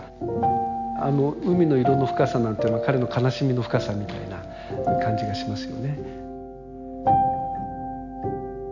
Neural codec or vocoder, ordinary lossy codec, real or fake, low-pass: none; none; real; 7.2 kHz